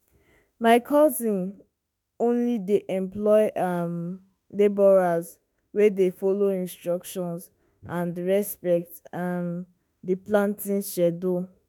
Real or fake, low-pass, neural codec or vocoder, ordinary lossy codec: fake; 19.8 kHz; autoencoder, 48 kHz, 32 numbers a frame, DAC-VAE, trained on Japanese speech; none